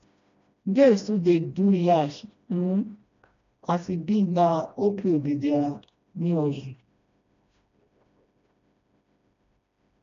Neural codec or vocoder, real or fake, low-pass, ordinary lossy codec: codec, 16 kHz, 1 kbps, FreqCodec, smaller model; fake; 7.2 kHz; MP3, 64 kbps